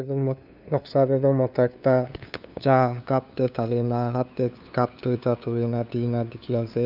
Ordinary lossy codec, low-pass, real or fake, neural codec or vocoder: none; 5.4 kHz; fake; codec, 16 kHz, 2 kbps, FunCodec, trained on LibriTTS, 25 frames a second